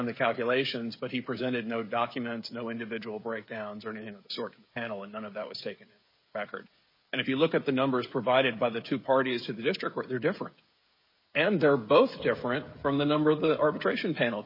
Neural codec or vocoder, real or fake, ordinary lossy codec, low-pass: codec, 16 kHz, 16 kbps, FreqCodec, smaller model; fake; MP3, 24 kbps; 5.4 kHz